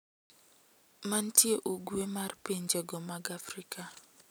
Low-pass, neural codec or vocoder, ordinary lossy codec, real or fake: none; none; none; real